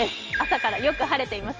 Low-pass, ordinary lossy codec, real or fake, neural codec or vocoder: 7.2 kHz; Opus, 24 kbps; real; none